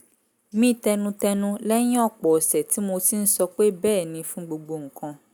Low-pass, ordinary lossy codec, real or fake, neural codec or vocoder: none; none; real; none